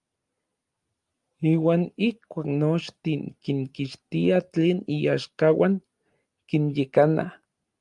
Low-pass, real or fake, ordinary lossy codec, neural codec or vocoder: 10.8 kHz; real; Opus, 32 kbps; none